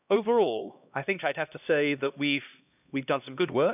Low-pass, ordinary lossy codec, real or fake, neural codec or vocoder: 3.6 kHz; none; fake; codec, 16 kHz, 1 kbps, X-Codec, HuBERT features, trained on LibriSpeech